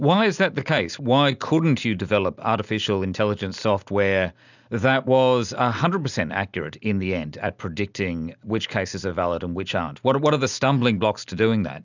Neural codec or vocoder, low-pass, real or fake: none; 7.2 kHz; real